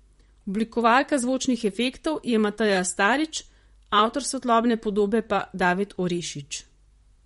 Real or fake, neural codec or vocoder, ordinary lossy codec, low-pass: fake; vocoder, 44.1 kHz, 128 mel bands, Pupu-Vocoder; MP3, 48 kbps; 19.8 kHz